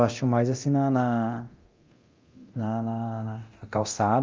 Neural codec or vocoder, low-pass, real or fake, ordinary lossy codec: codec, 24 kHz, 0.9 kbps, DualCodec; 7.2 kHz; fake; Opus, 32 kbps